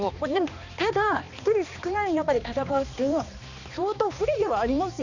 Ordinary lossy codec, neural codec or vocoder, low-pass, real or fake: none; codec, 16 kHz, 2 kbps, X-Codec, HuBERT features, trained on balanced general audio; 7.2 kHz; fake